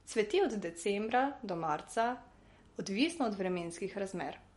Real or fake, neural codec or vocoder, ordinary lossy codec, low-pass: real; none; MP3, 48 kbps; 19.8 kHz